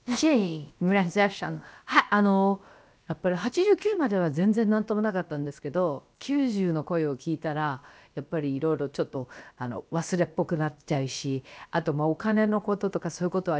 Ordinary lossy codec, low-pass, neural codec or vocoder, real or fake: none; none; codec, 16 kHz, about 1 kbps, DyCAST, with the encoder's durations; fake